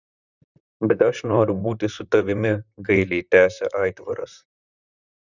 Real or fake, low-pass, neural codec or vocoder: fake; 7.2 kHz; vocoder, 44.1 kHz, 128 mel bands, Pupu-Vocoder